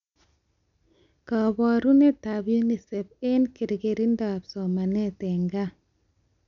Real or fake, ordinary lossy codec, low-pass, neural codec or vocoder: real; none; 7.2 kHz; none